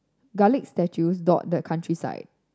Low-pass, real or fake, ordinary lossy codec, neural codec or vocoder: none; real; none; none